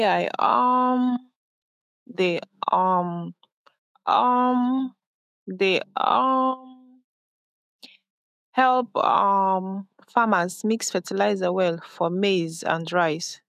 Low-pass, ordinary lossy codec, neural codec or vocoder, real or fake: 14.4 kHz; none; autoencoder, 48 kHz, 128 numbers a frame, DAC-VAE, trained on Japanese speech; fake